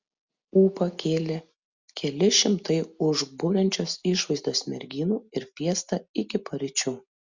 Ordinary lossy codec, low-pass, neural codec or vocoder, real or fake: Opus, 64 kbps; 7.2 kHz; none; real